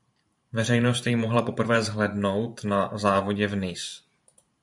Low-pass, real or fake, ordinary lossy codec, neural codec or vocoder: 10.8 kHz; fake; MP3, 64 kbps; vocoder, 44.1 kHz, 128 mel bands every 512 samples, BigVGAN v2